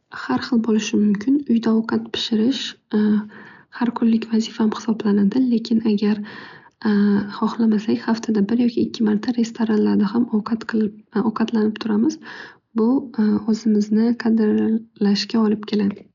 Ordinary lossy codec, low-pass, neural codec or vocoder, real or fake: none; 7.2 kHz; none; real